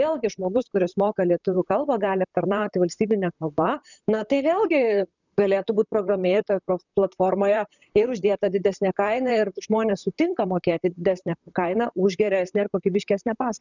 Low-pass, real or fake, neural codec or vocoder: 7.2 kHz; fake; vocoder, 44.1 kHz, 128 mel bands, Pupu-Vocoder